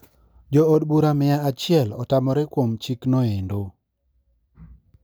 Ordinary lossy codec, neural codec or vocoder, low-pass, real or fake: none; none; none; real